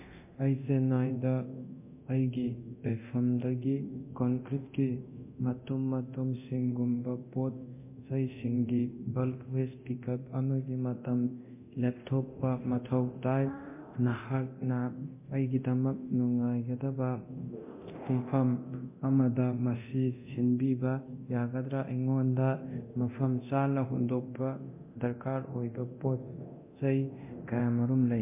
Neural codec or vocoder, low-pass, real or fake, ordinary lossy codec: codec, 24 kHz, 0.9 kbps, DualCodec; 3.6 kHz; fake; MP3, 24 kbps